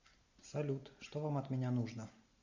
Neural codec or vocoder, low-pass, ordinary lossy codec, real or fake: none; 7.2 kHz; MP3, 64 kbps; real